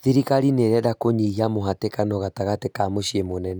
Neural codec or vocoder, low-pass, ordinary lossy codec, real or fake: none; none; none; real